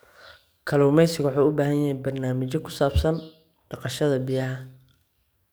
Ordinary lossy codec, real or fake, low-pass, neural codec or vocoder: none; fake; none; codec, 44.1 kHz, 7.8 kbps, DAC